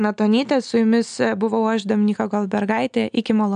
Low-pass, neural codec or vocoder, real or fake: 9.9 kHz; none; real